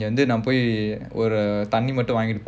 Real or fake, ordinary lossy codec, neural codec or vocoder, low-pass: real; none; none; none